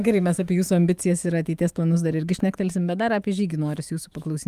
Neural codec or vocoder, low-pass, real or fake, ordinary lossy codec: autoencoder, 48 kHz, 128 numbers a frame, DAC-VAE, trained on Japanese speech; 14.4 kHz; fake; Opus, 32 kbps